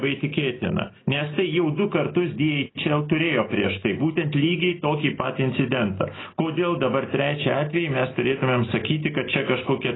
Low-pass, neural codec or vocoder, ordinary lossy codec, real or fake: 7.2 kHz; none; AAC, 16 kbps; real